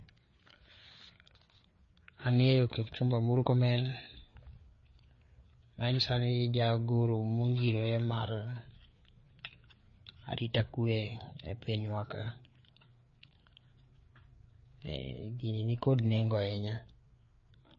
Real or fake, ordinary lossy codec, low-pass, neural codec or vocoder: fake; MP3, 32 kbps; 7.2 kHz; codec, 16 kHz, 4 kbps, FreqCodec, larger model